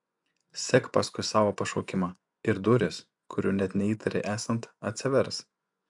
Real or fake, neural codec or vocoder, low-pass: fake; vocoder, 24 kHz, 100 mel bands, Vocos; 10.8 kHz